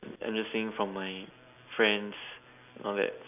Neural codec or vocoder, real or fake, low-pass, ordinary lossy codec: none; real; 3.6 kHz; none